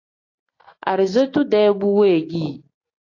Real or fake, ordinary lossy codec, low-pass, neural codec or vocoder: real; AAC, 32 kbps; 7.2 kHz; none